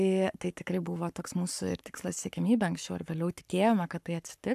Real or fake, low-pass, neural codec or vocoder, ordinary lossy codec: fake; 14.4 kHz; codec, 44.1 kHz, 7.8 kbps, Pupu-Codec; AAC, 96 kbps